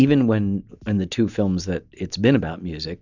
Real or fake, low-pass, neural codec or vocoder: real; 7.2 kHz; none